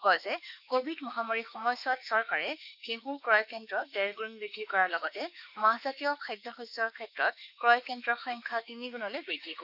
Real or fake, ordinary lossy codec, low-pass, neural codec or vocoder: fake; none; 5.4 kHz; autoencoder, 48 kHz, 32 numbers a frame, DAC-VAE, trained on Japanese speech